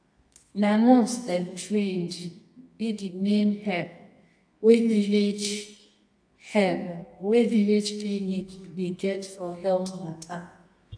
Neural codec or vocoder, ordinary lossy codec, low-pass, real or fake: codec, 24 kHz, 0.9 kbps, WavTokenizer, medium music audio release; AAC, 64 kbps; 9.9 kHz; fake